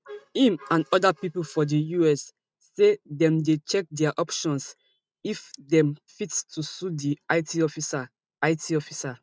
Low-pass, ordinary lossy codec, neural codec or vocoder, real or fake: none; none; none; real